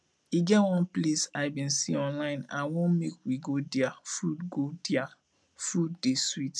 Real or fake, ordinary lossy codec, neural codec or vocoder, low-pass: real; none; none; none